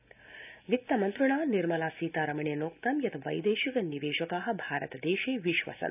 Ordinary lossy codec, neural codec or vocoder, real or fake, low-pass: none; none; real; 3.6 kHz